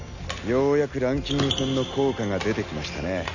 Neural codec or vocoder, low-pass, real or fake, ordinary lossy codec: none; 7.2 kHz; real; none